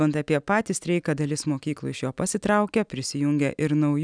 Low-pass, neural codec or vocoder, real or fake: 9.9 kHz; none; real